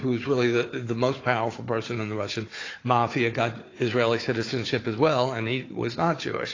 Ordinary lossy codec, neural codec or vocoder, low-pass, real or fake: AAC, 32 kbps; codec, 16 kHz, 4 kbps, FunCodec, trained on Chinese and English, 50 frames a second; 7.2 kHz; fake